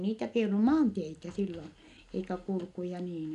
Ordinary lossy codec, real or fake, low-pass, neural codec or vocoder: none; real; 10.8 kHz; none